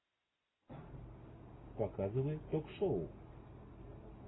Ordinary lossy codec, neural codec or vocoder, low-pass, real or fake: AAC, 16 kbps; none; 7.2 kHz; real